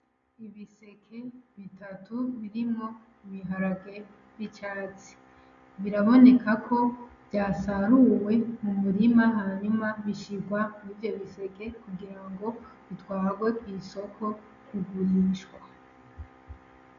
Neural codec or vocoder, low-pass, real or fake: none; 7.2 kHz; real